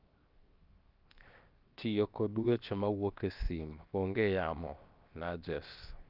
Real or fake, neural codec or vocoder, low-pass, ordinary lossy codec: fake; codec, 16 kHz, 0.7 kbps, FocalCodec; 5.4 kHz; Opus, 24 kbps